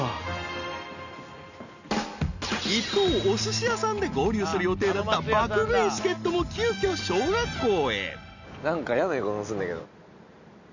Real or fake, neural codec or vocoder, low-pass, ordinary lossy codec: real; none; 7.2 kHz; none